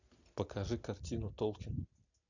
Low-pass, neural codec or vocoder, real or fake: 7.2 kHz; none; real